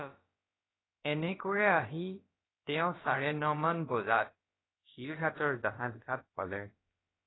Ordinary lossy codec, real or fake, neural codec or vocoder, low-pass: AAC, 16 kbps; fake; codec, 16 kHz, about 1 kbps, DyCAST, with the encoder's durations; 7.2 kHz